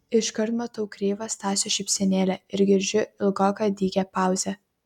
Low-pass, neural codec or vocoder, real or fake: 19.8 kHz; none; real